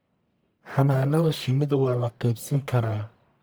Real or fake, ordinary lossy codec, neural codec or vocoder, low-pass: fake; none; codec, 44.1 kHz, 1.7 kbps, Pupu-Codec; none